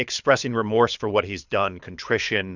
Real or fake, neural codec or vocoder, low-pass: fake; codec, 24 kHz, 6 kbps, HILCodec; 7.2 kHz